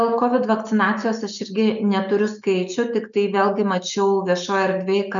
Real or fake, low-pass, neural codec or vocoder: real; 7.2 kHz; none